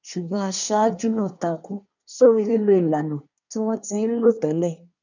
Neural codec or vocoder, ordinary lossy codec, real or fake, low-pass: codec, 24 kHz, 1 kbps, SNAC; none; fake; 7.2 kHz